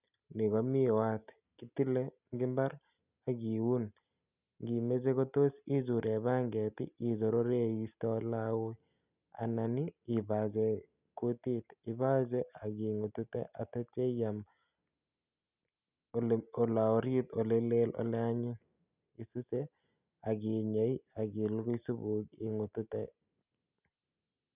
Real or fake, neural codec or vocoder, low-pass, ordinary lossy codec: real; none; 3.6 kHz; none